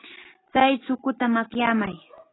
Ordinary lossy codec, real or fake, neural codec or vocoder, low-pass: AAC, 16 kbps; real; none; 7.2 kHz